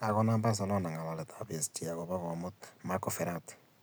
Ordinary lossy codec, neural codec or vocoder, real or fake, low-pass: none; none; real; none